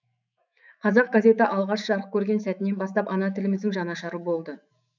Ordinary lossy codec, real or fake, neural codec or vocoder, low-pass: none; fake; autoencoder, 48 kHz, 128 numbers a frame, DAC-VAE, trained on Japanese speech; 7.2 kHz